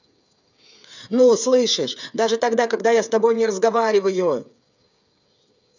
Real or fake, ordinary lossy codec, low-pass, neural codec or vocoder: fake; none; 7.2 kHz; codec, 16 kHz, 8 kbps, FreqCodec, smaller model